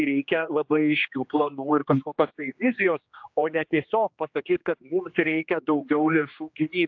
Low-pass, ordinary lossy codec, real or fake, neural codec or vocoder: 7.2 kHz; AAC, 48 kbps; fake; codec, 16 kHz, 2 kbps, X-Codec, HuBERT features, trained on general audio